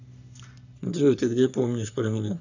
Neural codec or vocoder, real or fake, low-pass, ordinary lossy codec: codec, 44.1 kHz, 3.4 kbps, Pupu-Codec; fake; 7.2 kHz; none